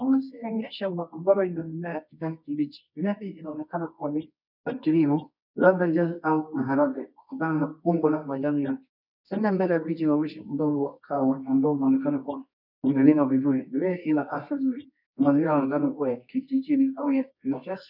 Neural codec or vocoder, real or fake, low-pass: codec, 24 kHz, 0.9 kbps, WavTokenizer, medium music audio release; fake; 5.4 kHz